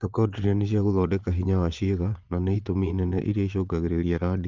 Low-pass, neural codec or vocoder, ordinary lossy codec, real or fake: 7.2 kHz; vocoder, 22.05 kHz, 80 mel bands, Vocos; Opus, 32 kbps; fake